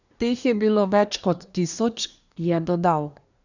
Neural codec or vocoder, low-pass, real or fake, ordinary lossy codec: codec, 24 kHz, 1 kbps, SNAC; 7.2 kHz; fake; none